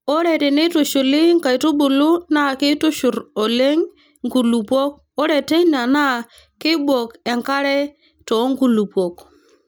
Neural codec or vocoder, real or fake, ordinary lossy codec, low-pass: none; real; none; none